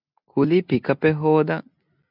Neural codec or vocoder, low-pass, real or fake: none; 5.4 kHz; real